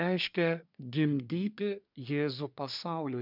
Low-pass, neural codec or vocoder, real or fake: 5.4 kHz; codec, 24 kHz, 1 kbps, SNAC; fake